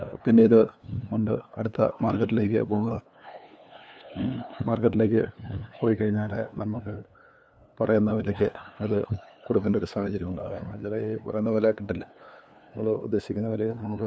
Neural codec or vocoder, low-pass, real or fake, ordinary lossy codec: codec, 16 kHz, 2 kbps, FunCodec, trained on LibriTTS, 25 frames a second; none; fake; none